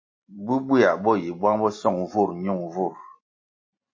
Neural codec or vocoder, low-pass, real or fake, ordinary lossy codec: none; 7.2 kHz; real; MP3, 32 kbps